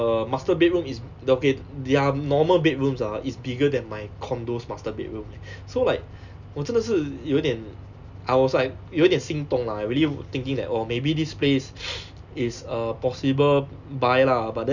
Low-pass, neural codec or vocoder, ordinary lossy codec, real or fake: 7.2 kHz; none; none; real